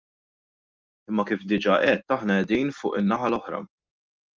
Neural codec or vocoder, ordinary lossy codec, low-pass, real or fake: none; Opus, 24 kbps; 7.2 kHz; real